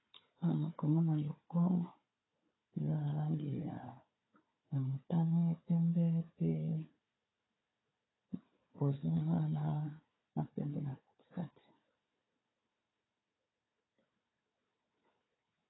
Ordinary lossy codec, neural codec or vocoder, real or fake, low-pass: AAC, 16 kbps; codec, 16 kHz, 4 kbps, FunCodec, trained on Chinese and English, 50 frames a second; fake; 7.2 kHz